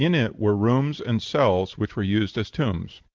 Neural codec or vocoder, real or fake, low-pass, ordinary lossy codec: none; real; 7.2 kHz; Opus, 32 kbps